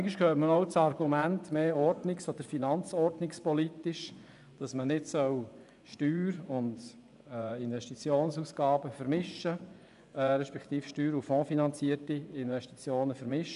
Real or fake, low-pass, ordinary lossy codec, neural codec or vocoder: fake; 10.8 kHz; none; vocoder, 24 kHz, 100 mel bands, Vocos